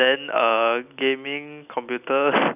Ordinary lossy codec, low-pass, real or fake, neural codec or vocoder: none; 3.6 kHz; real; none